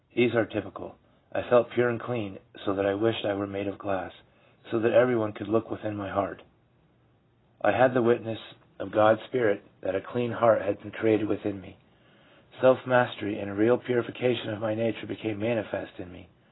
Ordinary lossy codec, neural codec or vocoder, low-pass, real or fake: AAC, 16 kbps; none; 7.2 kHz; real